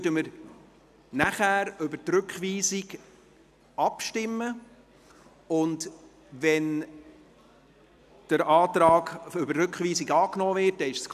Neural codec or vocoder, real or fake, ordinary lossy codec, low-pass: none; real; none; 14.4 kHz